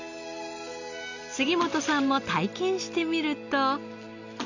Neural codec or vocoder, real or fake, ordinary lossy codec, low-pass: none; real; none; 7.2 kHz